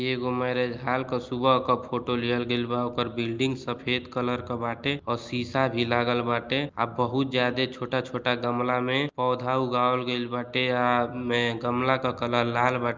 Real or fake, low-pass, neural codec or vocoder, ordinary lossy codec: real; 7.2 kHz; none; Opus, 24 kbps